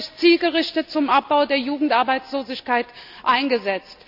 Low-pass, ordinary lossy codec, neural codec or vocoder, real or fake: 5.4 kHz; none; none; real